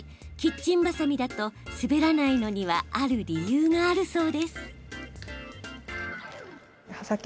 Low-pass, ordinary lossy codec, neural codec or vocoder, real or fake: none; none; none; real